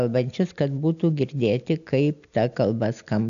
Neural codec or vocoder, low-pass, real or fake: none; 7.2 kHz; real